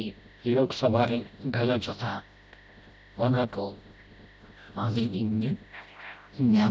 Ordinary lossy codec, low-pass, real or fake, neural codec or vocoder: none; none; fake; codec, 16 kHz, 0.5 kbps, FreqCodec, smaller model